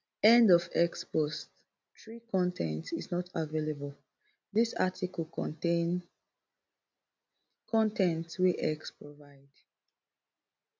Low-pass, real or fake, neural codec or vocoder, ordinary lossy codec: none; real; none; none